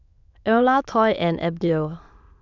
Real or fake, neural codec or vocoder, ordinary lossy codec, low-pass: fake; autoencoder, 22.05 kHz, a latent of 192 numbers a frame, VITS, trained on many speakers; none; 7.2 kHz